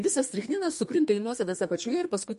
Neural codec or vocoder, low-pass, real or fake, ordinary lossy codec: codec, 32 kHz, 1.9 kbps, SNAC; 14.4 kHz; fake; MP3, 48 kbps